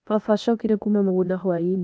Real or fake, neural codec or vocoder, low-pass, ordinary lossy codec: fake; codec, 16 kHz, 0.8 kbps, ZipCodec; none; none